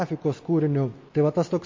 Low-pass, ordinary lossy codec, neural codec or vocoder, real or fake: 7.2 kHz; MP3, 32 kbps; none; real